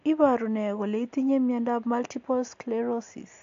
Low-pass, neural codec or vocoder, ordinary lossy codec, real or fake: 7.2 kHz; none; AAC, 48 kbps; real